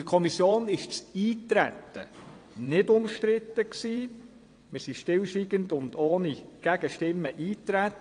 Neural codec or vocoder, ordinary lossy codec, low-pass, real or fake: vocoder, 22.05 kHz, 80 mel bands, WaveNeXt; AAC, 48 kbps; 9.9 kHz; fake